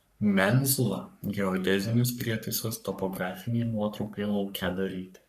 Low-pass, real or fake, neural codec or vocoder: 14.4 kHz; fake; codec, 44.1 kHz, 3.4 kbps, Pupu-Codec